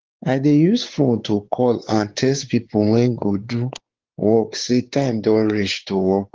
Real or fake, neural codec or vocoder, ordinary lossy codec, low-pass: fake; codec, 16 kHz, 4 kbps, X-Codec, WavLM features, trained on Multilingual LibriSpeech; Opus, 16 kbps; 7.2 kHz